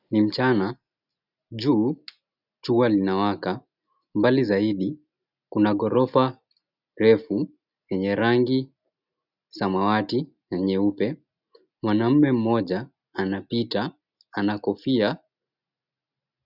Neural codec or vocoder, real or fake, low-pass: none; real; 5.4 kHz